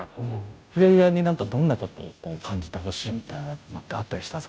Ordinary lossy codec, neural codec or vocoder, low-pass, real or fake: none; codec, 16 kHz, 0.5 kbps, FunCodec, trained on Chinese and English, 25 frames a second; none; fake